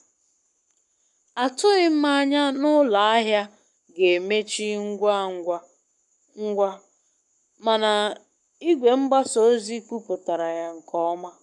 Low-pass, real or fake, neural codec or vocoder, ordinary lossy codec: 10.8 kHz; fake; codec, 44.1 kHz, 7.8 kbps, Pupu-Codec; none